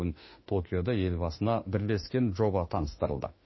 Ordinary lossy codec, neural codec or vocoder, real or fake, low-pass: MP3, 24 kbps; autoencoder, 48 kHz, 32 numbers a frame, DAC-VAE, trained on Japanese speech; fake; 7.2 kHz